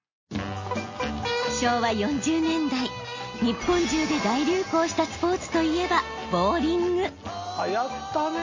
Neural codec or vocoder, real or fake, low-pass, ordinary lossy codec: none; real; 7.2 kHz; MP3, 32 kbps